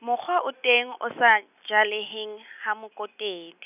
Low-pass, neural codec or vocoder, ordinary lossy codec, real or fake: 3.6 kHz; none; none; real